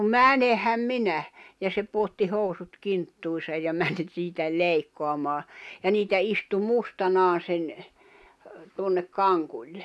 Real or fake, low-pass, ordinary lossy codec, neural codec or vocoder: real; none; none; none